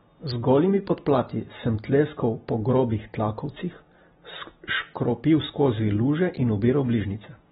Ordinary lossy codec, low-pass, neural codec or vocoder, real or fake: AAC, 16 kbps; 10.8 kHz; none; real